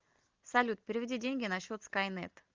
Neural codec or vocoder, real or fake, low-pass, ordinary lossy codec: none; real; 7.2 kHz; Opus, 32 kbps